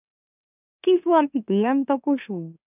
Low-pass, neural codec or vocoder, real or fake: 3.6 kHz; autoencoder, 44.1 kHz, a latent of 192 numbers a frame, MeloTTS; fake